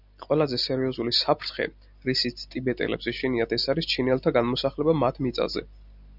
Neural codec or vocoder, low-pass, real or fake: none; 5.4 kHz; real